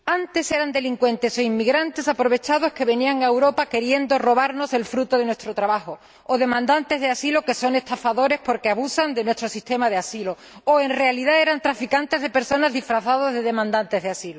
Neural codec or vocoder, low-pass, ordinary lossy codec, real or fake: none; none; none; real